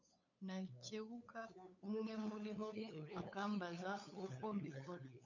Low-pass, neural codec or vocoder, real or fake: 7.2 kHz; codec, 16 kHz, 8 kbps, FunCodec, trained on LibriTTS, 25 frames a second; fake